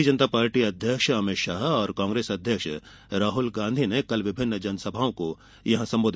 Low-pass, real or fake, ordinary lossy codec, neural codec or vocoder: none; real; none; none